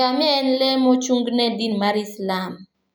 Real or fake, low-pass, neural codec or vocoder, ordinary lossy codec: real; none; none; none